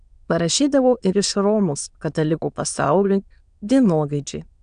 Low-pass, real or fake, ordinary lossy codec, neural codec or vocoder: 9.9 kHz; fake; AAC, 96 kbps; autoencoder, 22.05 kHz, a latent of 192 numbers a frame, VITS, trained on many speakers